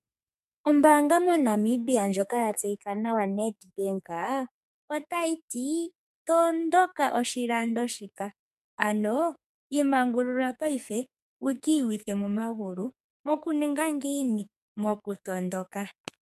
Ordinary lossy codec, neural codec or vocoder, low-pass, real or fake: MP3, 96 kbps; codec, 44.1 kHz, 2.6 kbps, SNAC; 14.4 kHz; fake